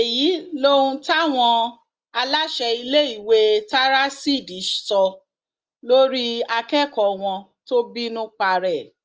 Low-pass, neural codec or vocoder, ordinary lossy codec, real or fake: 7.2 kHz; none; Opus, 24 kbps; real